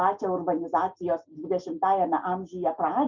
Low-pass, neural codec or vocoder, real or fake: 7.2 kHz; none; real